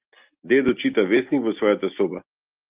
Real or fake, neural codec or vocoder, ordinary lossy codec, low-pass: real; none; Opus, 24 kbps; 3.6 kHz